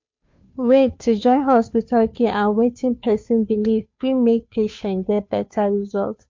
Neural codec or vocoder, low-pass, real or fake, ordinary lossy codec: codec, 16 kHz, 2 kbps, FunCodec, trained on Chinese and English, 25 frames a second; 7.2 kHz; fake; MP3, 48 kbps